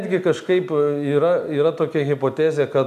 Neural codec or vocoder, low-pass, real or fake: none; 14.4 kHz; real